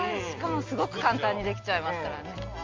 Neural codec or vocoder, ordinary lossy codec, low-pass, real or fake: none; Opus, 32 kbps; 7.2 kHz; real